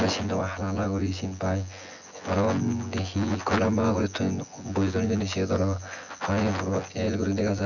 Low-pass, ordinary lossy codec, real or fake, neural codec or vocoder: 7.2 kHz; none; fake; vocoder, 24 kHz, 100 mel bands, Vocos